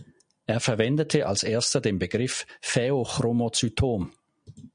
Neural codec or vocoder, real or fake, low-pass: none; real; 9.9 kHz